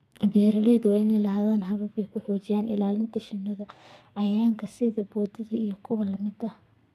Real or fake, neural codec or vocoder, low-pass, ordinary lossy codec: fake; codec, 32 kHz, 1.9 kbps, SNAC; 14.4 kHz; none